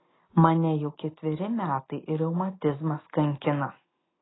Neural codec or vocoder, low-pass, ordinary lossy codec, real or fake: none; 7.2 kHz; AAC, 16 kbps; real